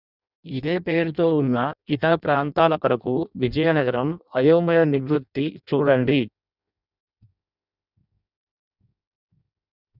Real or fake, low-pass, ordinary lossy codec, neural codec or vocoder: fake; 5.4 kHz; none; codec, 16 kHz in and 24 kHz out, 0.6 kbps, FireRedTTS-2 codec